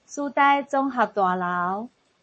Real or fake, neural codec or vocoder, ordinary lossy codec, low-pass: fake; codec, 44.1 kHz, 7.8 kbps, Pupu-Codec; MP3, 32 kbps; 10.8 kHz